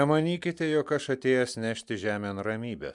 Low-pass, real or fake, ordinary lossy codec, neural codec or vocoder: 10.8 kHz; real; MP3, 96 kbps; none